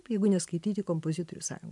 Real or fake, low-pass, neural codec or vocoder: real; 10.8 kHz; none